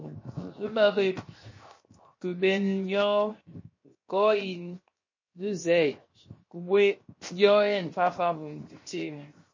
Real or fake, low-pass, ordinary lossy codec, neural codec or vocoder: fake; 7.2 kHz; MP3, 32 kbps; codec, 16 kHz, 0.7 kbps, FocalCodec